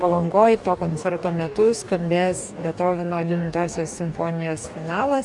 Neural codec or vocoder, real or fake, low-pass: codec, 44.1 kHz, 2.6 kbps, DAC; fake; 10.8 kHz